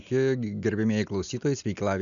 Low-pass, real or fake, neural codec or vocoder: 7.2 kHz; real; none